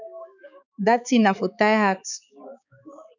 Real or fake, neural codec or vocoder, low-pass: fake; codec, 16 kHz, 4 kbps, X-Codec, HuBERT features, trained on balanced general audio; 7.2 kHz